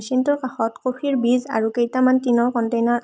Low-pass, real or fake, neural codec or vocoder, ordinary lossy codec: none; real; none; none